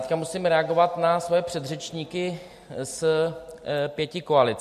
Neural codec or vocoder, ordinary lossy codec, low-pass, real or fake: none; MP3, 64 kbps; 14.4 kHz; real